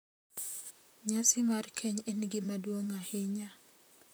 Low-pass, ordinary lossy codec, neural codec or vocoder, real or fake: none; none; vocoder, 44.1 kHz, 128 mel bands, Pupu-Vocoder; fake